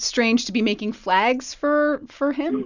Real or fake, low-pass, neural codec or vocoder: real; 7.2 kHz; none